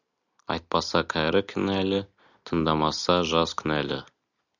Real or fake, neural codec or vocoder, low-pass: real; none; 7.2 kHz